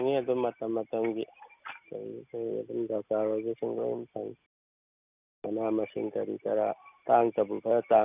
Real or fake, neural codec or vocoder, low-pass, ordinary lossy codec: real; none; 3.6 kHz; none